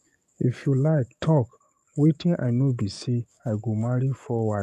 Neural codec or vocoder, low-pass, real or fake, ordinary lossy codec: codec, 44.1 kHz, 7.8 kbps, DAC; 14.4 kHz; fake; none